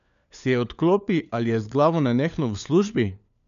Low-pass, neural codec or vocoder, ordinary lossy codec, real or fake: 7.2 kHz; codec, 16 kHz, 4 kbps, FunCodec, trained on LibriTTS, 50 frames a second; none; fake